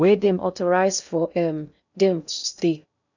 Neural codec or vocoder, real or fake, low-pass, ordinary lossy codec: codec, 16 kHz in and 24 kHz out, 0.6 kbps, FocalCodec, streaming, 2048 codes; fake; 7.2 kHz; none